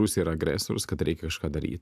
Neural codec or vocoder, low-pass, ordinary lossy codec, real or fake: none; 14.4 kHz; AAC, 96 kbps; real